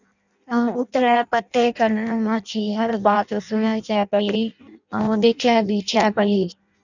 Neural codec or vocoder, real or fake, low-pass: codec, 16 kHz in and 24 kHz out, 0.6 kbps, FireRedTTS-2 codec; fake; 7.2 kHz